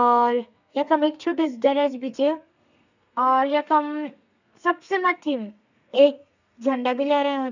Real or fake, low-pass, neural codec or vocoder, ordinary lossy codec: fake; 7.2 kHz; codec, 32 kHz, 1.9 kbps, SNAC; none